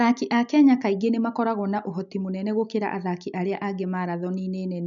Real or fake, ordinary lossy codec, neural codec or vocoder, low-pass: real; none; none; 7.2 kHz